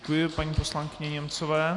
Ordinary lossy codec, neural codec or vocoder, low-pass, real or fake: Opus, 64 kbps; none; 10.8 kHz; real